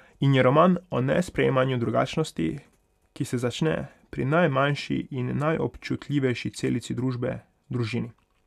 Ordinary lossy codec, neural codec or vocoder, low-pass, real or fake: none; none; 14.4 kHz; real